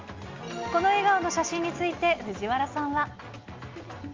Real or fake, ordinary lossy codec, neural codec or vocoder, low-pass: real; Opus, 32 kbps; none; 7.2 kHz